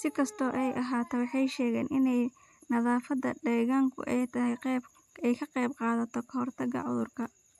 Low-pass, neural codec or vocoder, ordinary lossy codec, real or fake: 14.4 kHz; none; AAC, 96 kbps; real